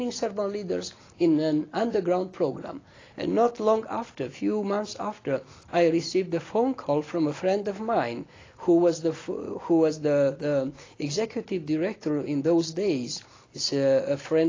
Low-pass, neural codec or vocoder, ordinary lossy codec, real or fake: 7.2 kHz; none; AAC, 32 kbps; real